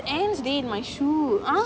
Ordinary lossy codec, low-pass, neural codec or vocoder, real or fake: none; none; none; real